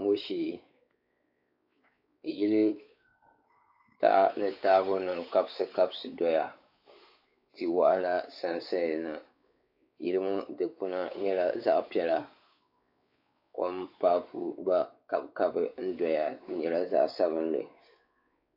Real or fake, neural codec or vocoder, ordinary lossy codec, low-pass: fake; codec, 16 kHz, 4 kbps, X-Codec, WavLM features, trained on Multilingual LibriSpeech; AAC, 48 kbps; 5.4 kHz